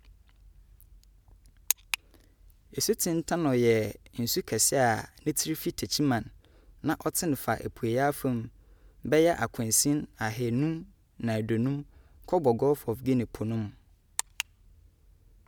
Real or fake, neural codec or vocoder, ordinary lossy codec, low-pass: real; none; none; none